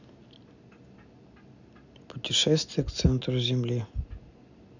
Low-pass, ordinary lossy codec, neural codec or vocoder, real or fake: 7.2 kHz; none; none; real